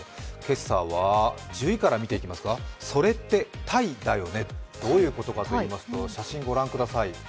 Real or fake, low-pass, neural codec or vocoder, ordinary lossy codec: real; none; none; none